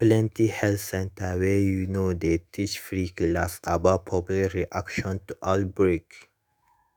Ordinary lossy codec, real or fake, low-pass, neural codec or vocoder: none; fake; none; autoencoder, 48 kHz, 128 numbers a frame, DAC-VAE, trained on Japanese speech